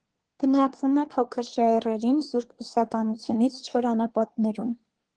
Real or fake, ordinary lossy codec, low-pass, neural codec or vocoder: fake; Opus, 16 kbps; 9.9 kHz; codec, 24 kHz, 1 kbps, SNAC